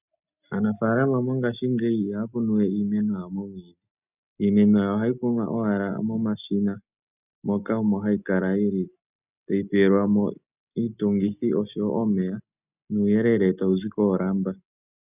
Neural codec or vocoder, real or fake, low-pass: none; real; 3.6 kHz